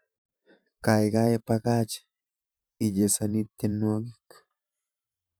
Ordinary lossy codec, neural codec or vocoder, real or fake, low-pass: none; vocoder, 44.1 kHz, 128 mel bands every 512 samples, BigVGAN v2; fake; none